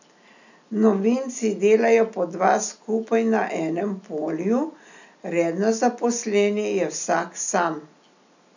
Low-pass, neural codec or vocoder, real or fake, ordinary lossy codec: 7.2 kHz; none; real; none